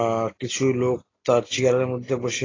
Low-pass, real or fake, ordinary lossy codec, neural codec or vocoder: 7.2 kHz; real; AAC, 32 kbps; none